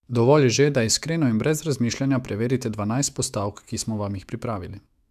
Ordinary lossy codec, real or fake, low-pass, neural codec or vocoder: AAC, 96 kbps; fake; 14.4 kHz; autoencoder, 48 kHz, 128 numbers a frame, DAC-VAE, trained on Japanese speech